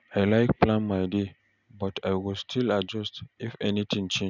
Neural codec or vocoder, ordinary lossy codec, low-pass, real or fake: none; none; 7.2 kHz; real